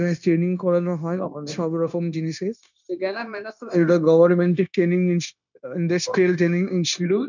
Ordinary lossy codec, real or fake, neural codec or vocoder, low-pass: none; fake; codec, 16 kHz, 0.9 kbps, LongCat-Audio-Codec; 7.2 kHz